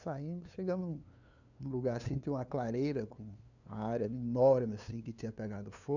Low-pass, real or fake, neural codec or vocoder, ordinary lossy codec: 7.2 kHz; fake; codec, 16 kHz, 2 kbps, FunCodec, trained on LibriTTS, 25 frames a second; none